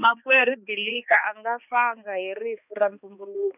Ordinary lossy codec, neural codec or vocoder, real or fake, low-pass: none; codec, 16 kHz, 2 kbps, X-Codec, HuBERT features, trained on balanced general audio; fake; 3.6 kHz